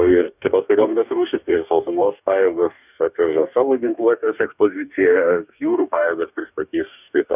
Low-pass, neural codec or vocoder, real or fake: 3.6 kHz; codec, 44.1 kHz, 2.6 kbps, DAC; fake